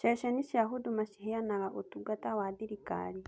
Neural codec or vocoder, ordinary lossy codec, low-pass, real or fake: none; none; none; real